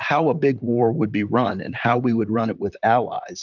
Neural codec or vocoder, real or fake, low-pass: vocoder, 44.1 kHz, 128 mel bands, Pupu-Vocoder; fake; 7.2 kHz